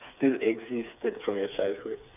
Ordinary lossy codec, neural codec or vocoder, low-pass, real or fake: none; codec, 16 kHz, 4 kbps, FreqCodec, smaller model; 3.6 kHz; fake